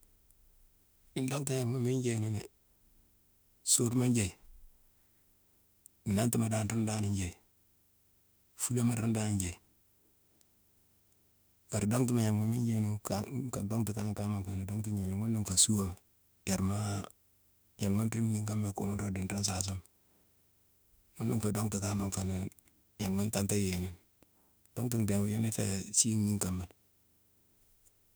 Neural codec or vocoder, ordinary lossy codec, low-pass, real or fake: autoencoder, 48 kHz, 32 numbers a frame, DAC-VAE, trained on Japanese speech; none; none; fake